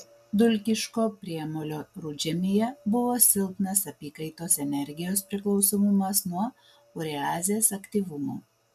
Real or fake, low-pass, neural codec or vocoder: real; 14.4 kHz; none